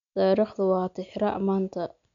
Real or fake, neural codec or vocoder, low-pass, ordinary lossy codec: real; none; 7.2 kHz; none